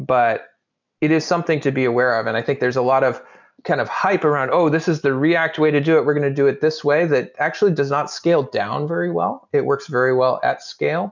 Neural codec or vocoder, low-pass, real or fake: none; 7.2 kHz; real